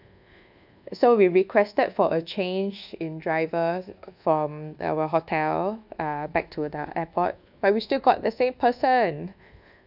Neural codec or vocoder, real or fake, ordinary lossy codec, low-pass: codec, 24 kHz, 1.2 kbps, DualCodec; fake; none; 5.4 kHz